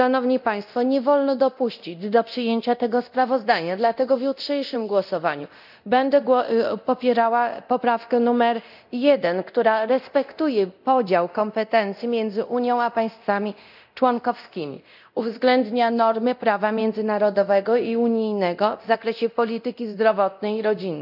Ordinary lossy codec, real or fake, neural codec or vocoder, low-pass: none; fake; codec, 24 kHz, 0.9 kbps, DualCodec; 5.4 kHz